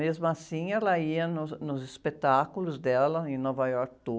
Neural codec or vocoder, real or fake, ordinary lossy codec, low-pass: none; real; none; none